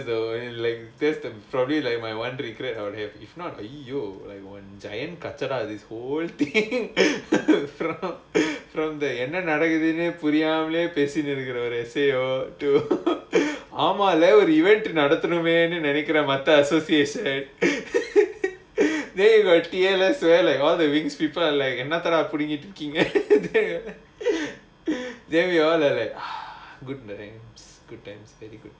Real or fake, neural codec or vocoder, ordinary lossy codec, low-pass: real; none; none; none